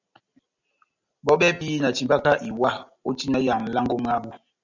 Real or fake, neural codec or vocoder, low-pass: real; none; 7.2 kHz